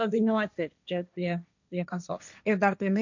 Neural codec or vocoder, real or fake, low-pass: codec, 16 kHz, 1.1 kbps, Voila-Tokenizer; fake; 7.2 kHz